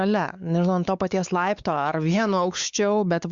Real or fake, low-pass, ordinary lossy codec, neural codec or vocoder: fake; 7.2 kHz; Opus, 64 kbps; codec, 16 kHz, 4 kbps, X-Codec, WavLM features, trained on Multilingual LibriSpeech